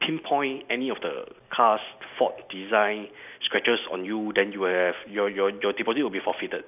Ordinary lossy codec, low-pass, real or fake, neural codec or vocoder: none; 3.6 kHz; real; none